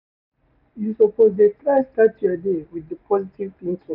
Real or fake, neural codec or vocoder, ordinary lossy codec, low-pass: real; none; none; 5.4 kHz